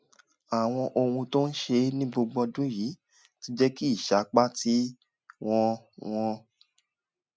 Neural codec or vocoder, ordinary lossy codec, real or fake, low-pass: none; none; real; none